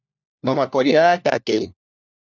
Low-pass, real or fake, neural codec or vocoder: 7.2 kHz; fake; codec, 16 kHz, 1 kbps, FunCodec, trained on LibriTTS, 50 frames a second